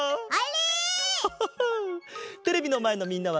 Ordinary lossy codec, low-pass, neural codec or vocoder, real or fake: none; none; none; real